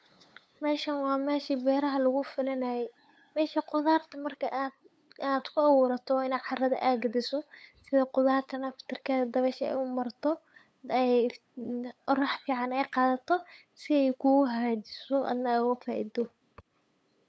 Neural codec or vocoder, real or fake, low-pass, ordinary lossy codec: codec, 16 kHz, 8 kbps, FunCodec, trained on LibriTTS, 25 frames a second; fake; none; none